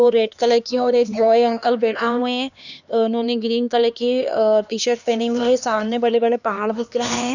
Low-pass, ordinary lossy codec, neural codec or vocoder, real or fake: 7.2 kHz; none; codec, 16 kHz, 2 kbps, X-Codec, HuBERT features, trained on LibriSpeech; fake